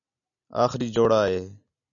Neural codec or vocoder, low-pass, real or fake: none; 7.2 kHz; real